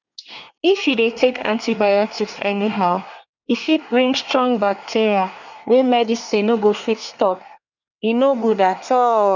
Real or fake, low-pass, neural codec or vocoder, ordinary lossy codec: fake; 7.2 kHz; codec, 24 kHz, 1 kbps, SNAC; none